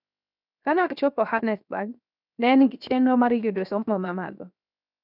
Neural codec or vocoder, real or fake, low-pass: codec, 16 kHz, 0.7 kbps, FocalCodec; fake; 5.4 kHz